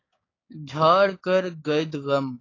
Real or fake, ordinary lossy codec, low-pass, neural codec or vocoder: fake; AAC, 32 kbps; 7.2 kHz; codec, 16 kHz, 6 kbps, DAC